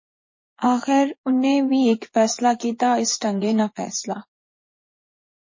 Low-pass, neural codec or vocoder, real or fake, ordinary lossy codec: 7.2 kHz; vocoder, 24 kHz, 100 mel bands, Vocos; fake; MP3, 32 kbps